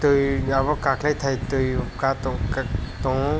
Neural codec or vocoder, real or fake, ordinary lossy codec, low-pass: none; real; none; none